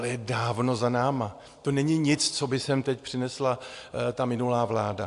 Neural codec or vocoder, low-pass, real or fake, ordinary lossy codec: none; 10.8 kHz; real; AAC, 64 kbps